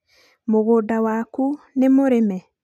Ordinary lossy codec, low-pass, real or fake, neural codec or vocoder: none; 14.4 kHz; real; none